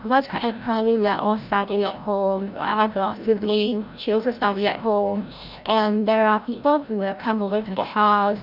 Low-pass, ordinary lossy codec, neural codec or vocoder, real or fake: 5.4 kHz; none; codec, 16 kHz, 0.5 kbps, FreqCodec, larger model; fake